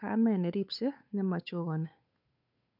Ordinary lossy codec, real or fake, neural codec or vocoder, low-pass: none; fake; codec, 16 kHz, 8 kbps, FunCodec, trained on Chinese and English, 25 frames a second; 5.4 kHz